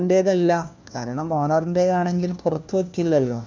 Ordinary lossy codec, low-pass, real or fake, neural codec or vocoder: none; none; fake; codec, 16 kHz, 1 kbps, FunCodec, trained on LibriTTS, 50 frames a second